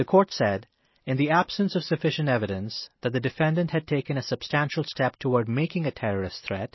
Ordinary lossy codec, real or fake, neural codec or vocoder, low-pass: MP3, 24 kbps; real; none; 7.2 kHz